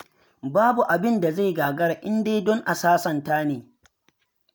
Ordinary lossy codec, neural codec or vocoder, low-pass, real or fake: none; none; none; real